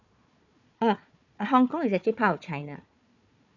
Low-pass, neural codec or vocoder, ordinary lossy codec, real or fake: 7.2 kHz; codec, 16 kHz, 4 kbps, FunCodec, trained on Chinese and English, 50 frames a second; none; fake